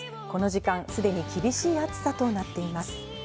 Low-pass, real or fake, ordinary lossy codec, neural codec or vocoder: none; real; none; none